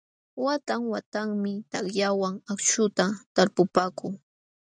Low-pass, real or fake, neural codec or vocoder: 9.9 kHz; real; none